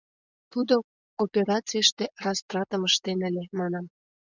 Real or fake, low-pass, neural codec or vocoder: fake; 7.2 kHz; vocoder, 24 kHz, 100 mel bands, Vocos